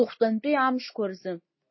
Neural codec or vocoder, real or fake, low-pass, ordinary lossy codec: none; real; 7.2 kHz; MP3, 24 kbps